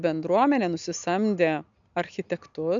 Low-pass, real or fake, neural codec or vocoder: 7.2 kHz; real; none